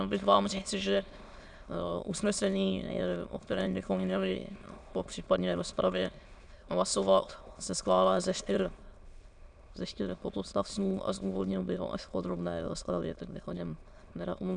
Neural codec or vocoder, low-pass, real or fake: autoencoder, 22.05 kHz, a latent of 192 numbers a frame, VITS, trained on many speakers; 9.9 kHz; fake